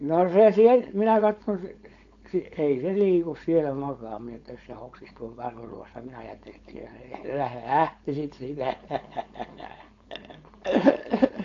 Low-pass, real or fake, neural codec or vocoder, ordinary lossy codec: 7.2 kHz; fake; codec, 16 kHz, 4.8 kbps, FACodec; AAC, 48 kbps